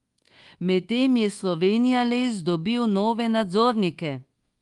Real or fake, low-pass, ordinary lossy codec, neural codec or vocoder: fake; 10.8 kHz; Opus, 24 kbps; codec, 24 kHz, 1.2 kbps, DualCodec